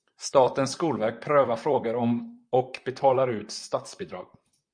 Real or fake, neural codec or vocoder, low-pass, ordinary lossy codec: fake; vocoder, 44.1 kHz, 128 mel bands, Pupu-Vocoder; 9.9 kHz; MP3, 96 kbps